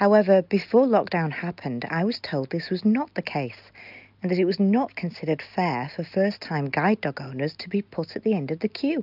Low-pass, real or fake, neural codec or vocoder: 5.4 kHz; real; none